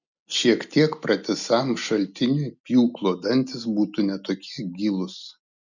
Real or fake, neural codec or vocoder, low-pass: real; none; 7.2 kHz